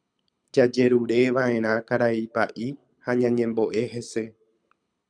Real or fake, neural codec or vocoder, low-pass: fake; codec, 24 kHz, 6 kbps, HILCodec; 9.9 kHz